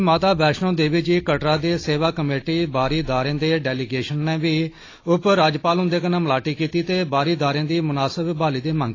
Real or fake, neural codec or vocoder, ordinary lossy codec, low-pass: real; none; AAC, 32 kbps; 7.2 kHz